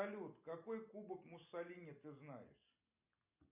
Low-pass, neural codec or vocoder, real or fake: 3.6 kHz; none; real